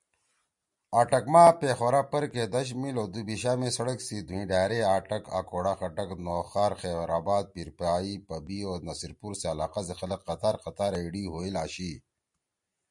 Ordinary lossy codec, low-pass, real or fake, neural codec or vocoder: MP3, 96 kbps; 10.8 kHz; real; none